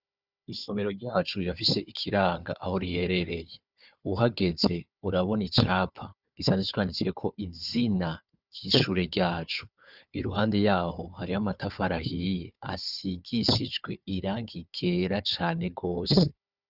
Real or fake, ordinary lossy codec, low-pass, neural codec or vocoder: fake; Opus, 64 kbps; 5.4 kHz; codec, 16 kHz, 4 kbps, FunCodec, trained on Chinese and English, 50 frames a second